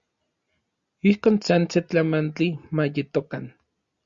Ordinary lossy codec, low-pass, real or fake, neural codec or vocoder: Opus, 64 kbps; 7.2 kHz; real; none